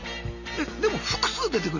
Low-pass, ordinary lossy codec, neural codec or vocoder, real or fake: 7.2 kHz; none; none; real